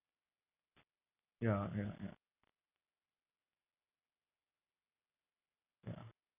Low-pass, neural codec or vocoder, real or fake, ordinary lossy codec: 3.6 kHz; none; real; none